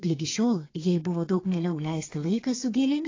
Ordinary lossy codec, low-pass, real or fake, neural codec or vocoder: AAC, 32 kbps; 7.2 kHz; fake; codec, 32 kHz, 1.9 kbps, SNAC